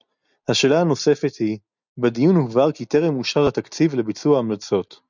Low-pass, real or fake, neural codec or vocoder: 7.2 kHz; real; none